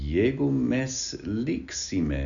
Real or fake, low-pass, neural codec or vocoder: real; 7.2 kHz; none